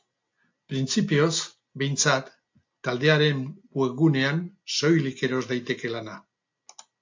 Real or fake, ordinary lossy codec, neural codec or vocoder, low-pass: real; AAC, 48 kbps; none; 7.2 kHz